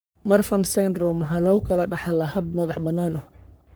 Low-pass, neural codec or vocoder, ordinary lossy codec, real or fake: none; codec, 44.1 kHz, 3.4 kbps, Pupu-Codec; none; fake